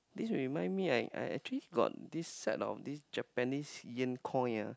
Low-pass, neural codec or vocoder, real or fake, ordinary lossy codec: none; none; real; none